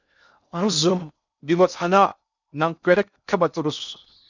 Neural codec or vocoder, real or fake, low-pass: codec, 16 kHz in and 24 kHz out, 0.6 kbps, FocalCodec, streaming, 4096 codes; fake; 7.2 kHz